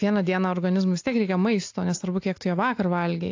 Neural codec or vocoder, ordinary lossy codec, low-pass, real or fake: none; AAC, 48 kbps; 7.2 kHz; real